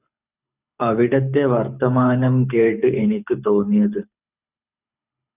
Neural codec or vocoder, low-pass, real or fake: codec, 24 kHz, 6 kbps, HILCodec; 3.6 kHz; fake